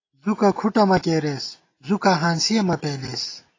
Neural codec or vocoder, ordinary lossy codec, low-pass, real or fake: none; AAC, 32 kbps; 7.2 kHz; real